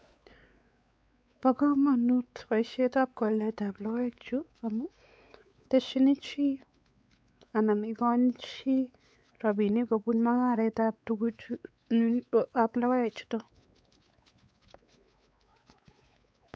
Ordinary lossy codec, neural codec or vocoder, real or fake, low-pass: none; codec, 16 kHz, 4 kbps, X-Codec, WavLM features, trained on Multilingual LibriSpeech; fake; none